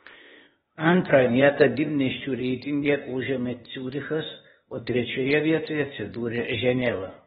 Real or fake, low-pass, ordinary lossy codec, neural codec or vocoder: fake; 7.2 kHz; AAC, 16 kbps; codec, 16 kHz, 0.8 kbps, ZipCodec